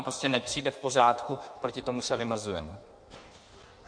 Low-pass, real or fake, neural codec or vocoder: 9.9 kHz; fake; codec, 16 kHz in and 24 kHz out, 1.1 kbps, FireRedTTS-2 codec